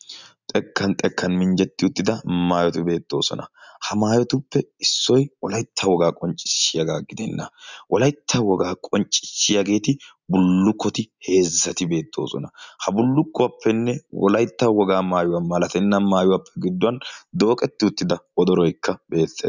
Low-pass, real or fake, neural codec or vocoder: 7.2 kHz; real; none